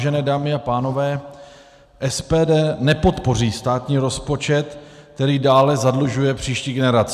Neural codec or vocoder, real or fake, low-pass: none; real; 14.4 kHz